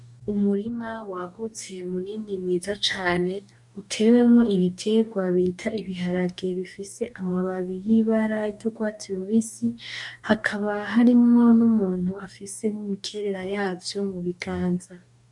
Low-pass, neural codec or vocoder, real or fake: 10.8 kHz; codec, 44.1 kHz, 2.6 kbps, DAC; fake